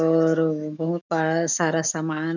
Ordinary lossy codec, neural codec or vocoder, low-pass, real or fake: none; autoencoder, 48 kHz, 128 numbers a frame, DAC-VAE, trained on Japanese speech; 7.2 kHz; fake